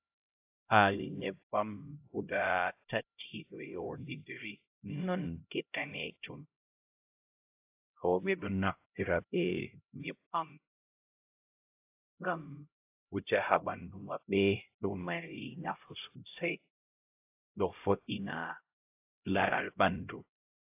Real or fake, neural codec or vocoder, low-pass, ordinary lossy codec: fake; codec, 16 kHz, 0.5 kbps, X-Codec, HuBERT features, trained on LibriSpeech; 3.6 kHz; AAC, 32 kbps